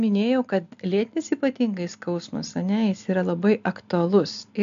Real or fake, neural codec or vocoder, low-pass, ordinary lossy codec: real; none; 7.2 kHz; MP3, 64 kbps